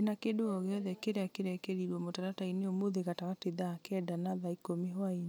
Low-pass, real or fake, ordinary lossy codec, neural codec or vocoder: none; real; none; none